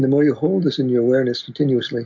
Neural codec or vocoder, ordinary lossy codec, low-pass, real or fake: none; MP3, 48 kbps; 7.2 kHz; real